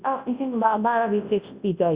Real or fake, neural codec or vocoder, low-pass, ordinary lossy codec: fake; codec, 24 kHz, 0.9 kbps, WavTokenizer, large speech release; 3.6 kHz; Opus, 64 kbps